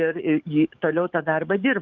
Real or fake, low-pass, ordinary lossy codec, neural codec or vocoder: fake; 7.2 kHz; Opus, 24 kbps; codec, 44.1 kHz, 7.8 kbps, DAC